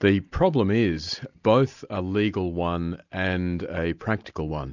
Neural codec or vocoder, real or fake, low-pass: none; real; 7.2 kHz